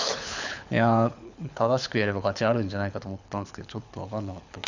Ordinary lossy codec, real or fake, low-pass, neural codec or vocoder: none; fake; 7.2 kHz; codec, 24 kHz, 6 kbps, HILCodec